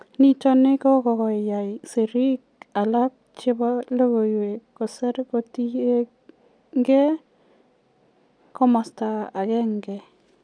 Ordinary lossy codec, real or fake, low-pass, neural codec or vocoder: none; real; 9.9 kHz; none